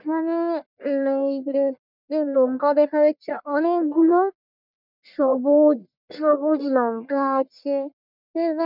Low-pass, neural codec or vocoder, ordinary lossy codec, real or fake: 5.4 kHz; codec, 44.1 kHz, 1.7 kbps, Pupu-Codec; none; fake